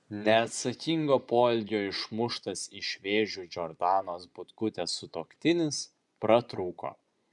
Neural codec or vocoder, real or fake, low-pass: vocoder, 24 kHz, 100 mel bands, Vocos; fake; 10.8 kHz